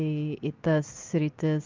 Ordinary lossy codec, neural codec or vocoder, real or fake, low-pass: Opus, 24 kbps; none; real; 7.2 kHz